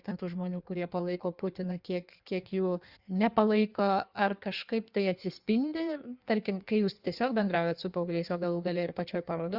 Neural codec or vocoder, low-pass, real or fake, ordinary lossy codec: codec, 16 kHz in and 24 kHz out, 1.1 kbps, FireRedTTS-2 codec; 5.4 kHz; fake; Opus, 64 kbps